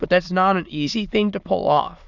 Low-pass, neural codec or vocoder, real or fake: 7.2 kHz; autoencoder, 22.05 kHz, a latent of 192 numbers a frame, VITS, trained on many speakers; fake